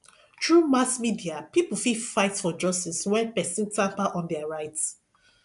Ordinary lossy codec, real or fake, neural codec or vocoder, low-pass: none; real; none; 10.8 kHz